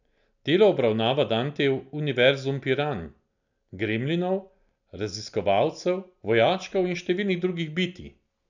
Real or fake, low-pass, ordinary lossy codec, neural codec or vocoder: real; 7.2 kHz; none; none